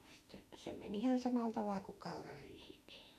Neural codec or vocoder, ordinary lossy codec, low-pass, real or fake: autoencoder, 48 kHz, 32 numbers a frame, DAC-VAE, trained on Japanese speech; none; 14.4 kHz; fake